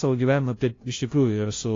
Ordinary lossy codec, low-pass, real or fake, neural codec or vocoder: AAC, 32 kbps; 7.2 kHz; fake; codec, 16 kHz, 0.5 kbps, FunCodec, trained on LibriTTS, 25 frames a second